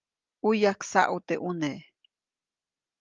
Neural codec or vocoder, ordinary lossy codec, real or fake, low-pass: none; Opus, 32 kbps; real; 7.2 kHz